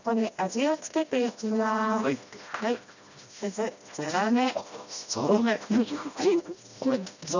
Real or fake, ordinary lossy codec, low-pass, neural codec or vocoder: fake; none; 7.2 kHz; codec, 16 kHz, 1 kbps, FreqCodec, smaller model